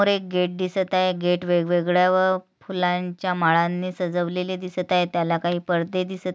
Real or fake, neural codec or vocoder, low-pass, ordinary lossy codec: real; none; none; none